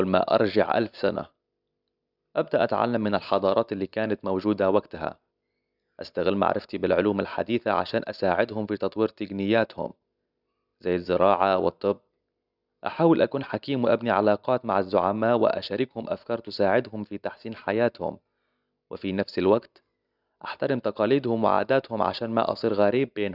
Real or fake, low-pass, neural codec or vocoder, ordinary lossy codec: real; 5.4 kHz; none; none